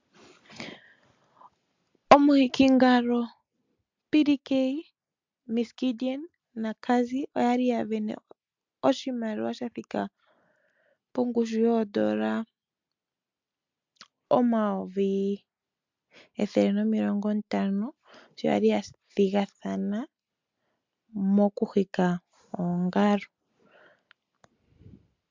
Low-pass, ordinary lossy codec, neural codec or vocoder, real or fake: 7.2 kHz; MP3, 64 kbps; none; real